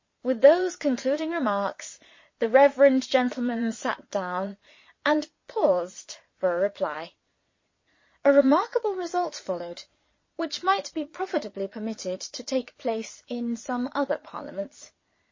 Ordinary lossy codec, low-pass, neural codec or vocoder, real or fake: MP3, 32 kbps; 7.2 kHz; vocoder, 22.05 kHz, 80 mel bands, Vocos; fake